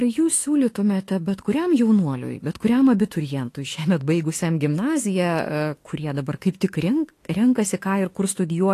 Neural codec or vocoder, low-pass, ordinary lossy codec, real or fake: autoencoder, 48 kHz, 32 numbers a frame, DAC-VAE, trained on Japanese speech; 14.4 kHz; AAC, 48 kbps; fake